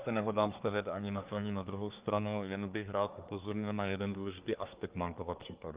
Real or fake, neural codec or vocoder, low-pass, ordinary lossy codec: fake; codec, 24 kHz, 1 kbps, SNAC; 3.6 kHz; Opus, 24 kbps